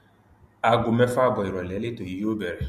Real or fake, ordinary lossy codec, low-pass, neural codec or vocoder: real; MP3, 64 kbps; 14.4 kHz; none